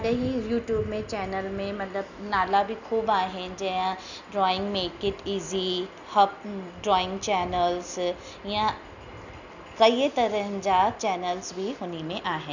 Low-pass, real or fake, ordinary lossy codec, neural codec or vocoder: 7.2 kHz; real; none; none